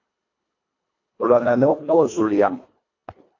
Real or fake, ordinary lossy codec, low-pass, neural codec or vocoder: fake; AAC, 48 kbps; 7.2 kHz; codec, 24 kHz, 1.5 kbps, HILCodec